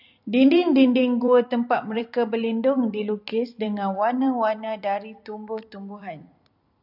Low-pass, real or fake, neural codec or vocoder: 5.4 kHz; fake; vocoder, 44.1 kHz, 128 mel bands every 256 samples, BigVGAN v2